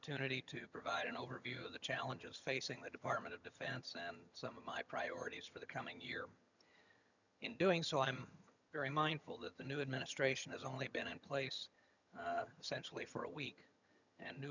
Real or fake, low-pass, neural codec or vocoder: fake; 7.2 kHz; vocoder, 22.05 kHz, 80 mel bands, HiFi-GAN